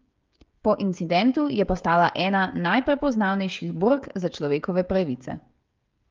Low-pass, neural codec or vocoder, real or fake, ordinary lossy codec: 7.2 kHz; codec, 16 kHz, 16 kbps, FreqCodec, smaller model; fake; Opus, 32 kbps